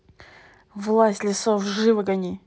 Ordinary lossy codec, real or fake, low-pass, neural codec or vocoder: none; real; none; none